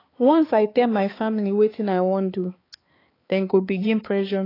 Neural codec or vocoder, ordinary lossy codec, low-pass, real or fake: codec, 16 kHz, 4 kbps, X-Codec, HuBERT features, trained on balanced general audio; AAC, 24 kbps; 5.4 kHz; fake